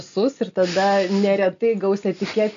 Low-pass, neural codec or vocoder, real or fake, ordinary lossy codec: 7.2 kHz; none; real; AAC, 64 kbps